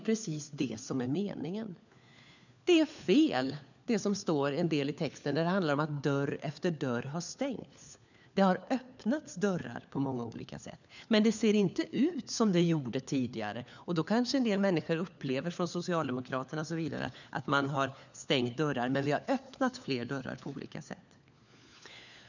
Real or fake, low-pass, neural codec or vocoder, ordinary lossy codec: fake; 7.2 kHz; codec, 16 kHz, 4 kbps, FunCodec, trained on LibriTTS, 50 frames a second; none